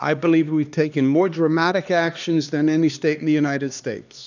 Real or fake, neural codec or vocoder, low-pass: fake; codec, 16 kHz, 2 kbps, X-Codec, HuBERT features, trained on LibriSpeech; 7.2 kHz